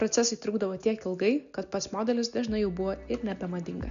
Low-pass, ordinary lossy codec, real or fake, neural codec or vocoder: 7.2 kHz; MP3, 96 kbps; real; none